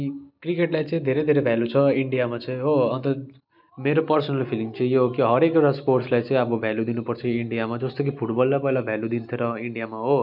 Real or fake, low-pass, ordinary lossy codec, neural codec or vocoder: real; 5.4 kHz; none; none